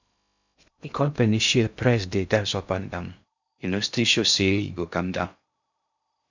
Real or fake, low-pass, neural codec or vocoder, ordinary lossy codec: fake; 7.2 kHz; codec, 16 kHz in and 24 kHz out, 0.6 kbps, FocalCodec, streaming, 2048 codes; none